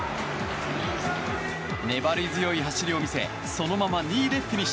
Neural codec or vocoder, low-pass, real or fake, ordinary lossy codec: none; none; real; none